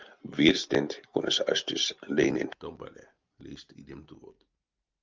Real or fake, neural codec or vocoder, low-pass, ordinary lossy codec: real; none; 7.2 kHz; Opus, 24 kbps